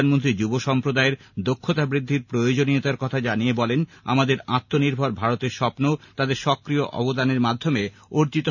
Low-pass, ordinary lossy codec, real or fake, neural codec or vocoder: 7.2 kHz; none; real; none